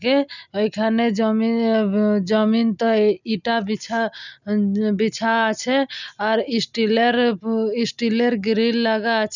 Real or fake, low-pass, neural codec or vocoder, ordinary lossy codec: real; 7.2 kHz; none; none